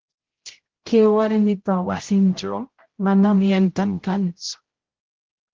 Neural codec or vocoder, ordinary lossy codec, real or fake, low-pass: codec, 16 kHz, 0.5 kbps, X-Codec, HuBERT features, trained on general audio; Opus, 16 kbps; fake; 7.2 kHz